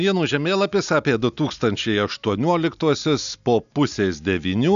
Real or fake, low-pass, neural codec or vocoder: real; 7.2 kHz; none